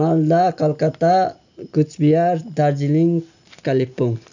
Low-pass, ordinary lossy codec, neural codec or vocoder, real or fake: 7.2 kHz; none; none; real